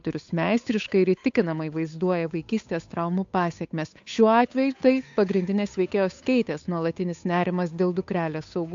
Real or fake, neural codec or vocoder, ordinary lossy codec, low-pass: fake; codec, 16 kHz, 8 kbps, FunCodec, trained on Chinese and English, 25 frames a second; AAC, 64 kbps; 7.2 kHz